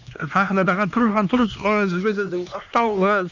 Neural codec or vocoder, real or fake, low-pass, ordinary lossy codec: codec, 16 kHz, 2 kbps, X-Codec, HuBERT features, trained on LibriSpeech; fake; 7.2 kHz; none